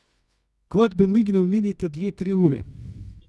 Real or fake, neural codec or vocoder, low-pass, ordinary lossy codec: fake; codec, 24 kHz, 0.9 kbps, WavTokenizer, medium music audio release; none; none